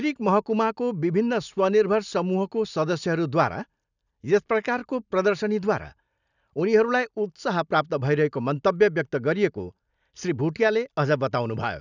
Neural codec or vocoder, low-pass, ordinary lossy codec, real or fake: none; 7.2 kHz; none; real